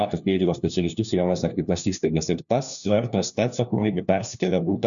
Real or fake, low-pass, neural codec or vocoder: fake; 7.2 kHz; codec, 16 kHz, 1 kbps, FunCodec, trained on LibriTTS, 50 frames a second